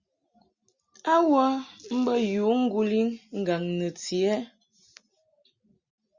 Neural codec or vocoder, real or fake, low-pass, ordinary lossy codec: none; real; 7.2 kHz; Opus, 64 kbps